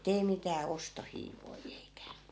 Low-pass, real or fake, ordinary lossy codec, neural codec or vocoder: none; real; none; none